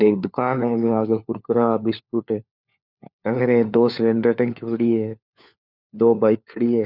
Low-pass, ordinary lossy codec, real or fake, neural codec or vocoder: 5.4 kHz; none; fake; codec, 16 kHz, 4 kbps, FunCodec, trained on LibriTTS, 50 frames a second